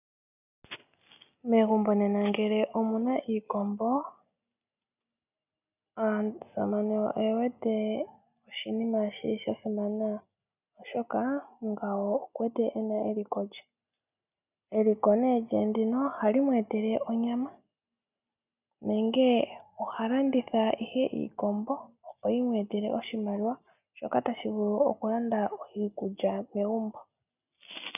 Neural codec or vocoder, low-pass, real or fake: none; 3.6 kHz; real